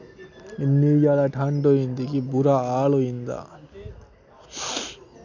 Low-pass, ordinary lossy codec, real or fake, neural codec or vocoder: 7.2 kHz; none; real; none